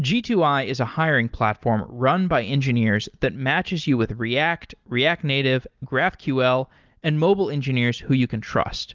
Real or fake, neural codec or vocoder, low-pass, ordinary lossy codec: real; none; 7.2 kHz; Opus, 32 kbps